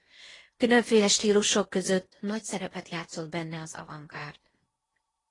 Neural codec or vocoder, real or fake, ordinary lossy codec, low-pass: codec, 16 kHz in and 24 kHz out, 0.8 kbps, FocalCodec, streaming, 65536 codes; fake; AAC, 32 kbps; 10.8 kHz